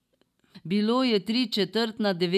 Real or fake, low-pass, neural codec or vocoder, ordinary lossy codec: real; 14.4 kHz; none; none